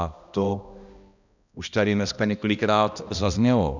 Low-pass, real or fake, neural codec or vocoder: 7.2 kHz; fake; codec, 16 kHz, 1 kbps, X-Codec, HuBERT features, trained on balanced general audio